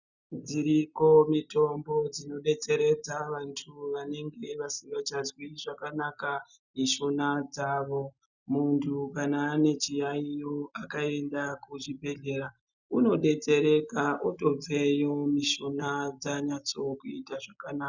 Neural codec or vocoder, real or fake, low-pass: none; real; 7.2 kHz